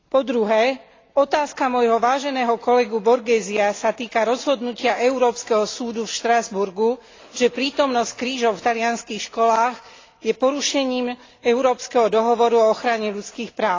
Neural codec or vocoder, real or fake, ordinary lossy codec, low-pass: none; real; AAC, 32 kbps; 7.2 kHz